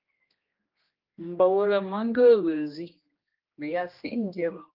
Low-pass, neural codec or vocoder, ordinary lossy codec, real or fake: 5.4 kHz; codec, 16 kHz, 1 kbps, X-Codec, HuBERT features, trained on general audio; Opus, 24 kbps; fake